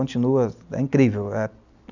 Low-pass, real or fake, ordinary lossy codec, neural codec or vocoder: 7.2 kHz; real; none; none